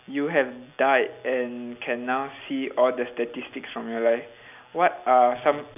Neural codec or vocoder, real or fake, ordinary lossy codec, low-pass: none; real; none; 3.6 kHz